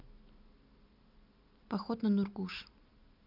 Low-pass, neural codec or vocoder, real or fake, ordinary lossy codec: 5.4 kHz; none; real; none